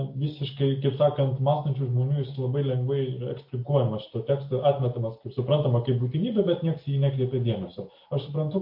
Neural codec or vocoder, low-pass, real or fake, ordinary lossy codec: none; 5.4 kHz; real; MP3, 32 kbps